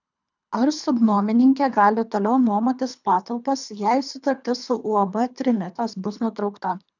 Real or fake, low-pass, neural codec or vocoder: fake; 7.2 kHz; codec, 24 kHz, 3 kbps, HILCodec